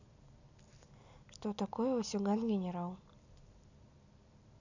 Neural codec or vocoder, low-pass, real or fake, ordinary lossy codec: none; 7.2 kHz; real; none